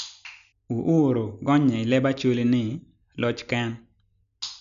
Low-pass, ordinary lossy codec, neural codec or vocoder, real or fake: 7.2 kHz; none; none; real